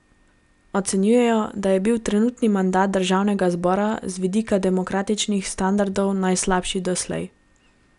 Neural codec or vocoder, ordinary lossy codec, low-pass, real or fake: none; none; 10.8 kHz; real